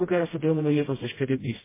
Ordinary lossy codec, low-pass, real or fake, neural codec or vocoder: MP3, 16 kbps; 3.6 kHz; fake; codec, 16 kHz, 0.5 kbps, FreqCodec, smaller model